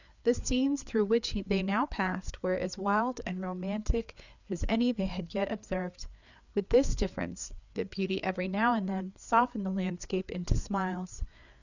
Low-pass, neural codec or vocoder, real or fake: 7.2 kHz; codec, 16 kHz, 4 kbps, FreqCodec, larger model; fake